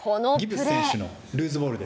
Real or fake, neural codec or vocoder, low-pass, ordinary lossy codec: real; none; none; none